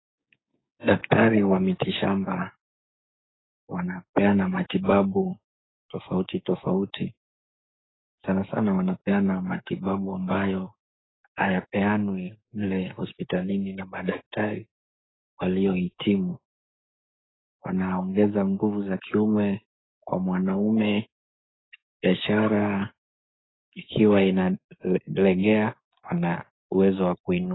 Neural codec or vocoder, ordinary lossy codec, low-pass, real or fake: codec, 16 kHz, 6 kbps, DAC; AAC, 16 kbps; 7.2 kHz; fake